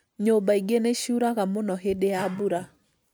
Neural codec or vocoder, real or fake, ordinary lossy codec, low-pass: none; real; none; none